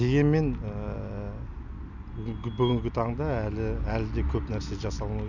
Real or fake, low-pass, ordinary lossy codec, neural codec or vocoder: real; 7.2 kHz; none; none